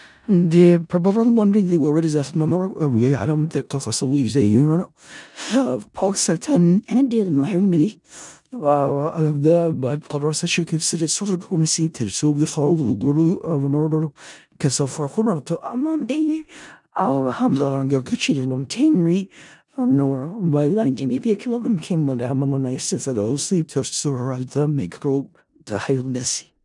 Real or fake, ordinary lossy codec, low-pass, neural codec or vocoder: fake; none; 10.8 kHz; codec, 16 kHz in and 24 kHz out, 0.4 kbps, LongCat-Audio-Codec, four codebook decoder